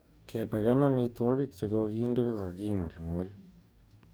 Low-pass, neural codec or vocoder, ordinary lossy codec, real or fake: none; codec, 44.1 kHz, 2.6 kbps, DAC; none; fake